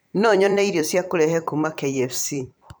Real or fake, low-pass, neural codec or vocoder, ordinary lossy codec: fake; none; vocoder, 44.1 kHz, 128 mel bands every 256 samples, BigVGAN v2; none